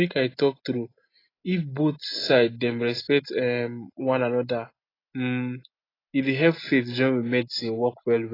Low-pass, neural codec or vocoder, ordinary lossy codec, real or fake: 5.4 kHz; none; AAC, 24 kbps; real